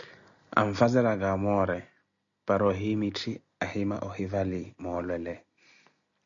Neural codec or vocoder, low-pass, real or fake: none; 7.2 kHz; real